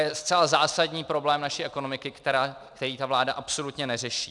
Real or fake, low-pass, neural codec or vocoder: real; 10.8 kHz; none